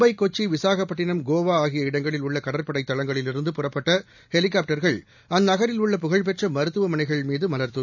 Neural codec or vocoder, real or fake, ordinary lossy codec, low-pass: none; real; none; 7.2 kHz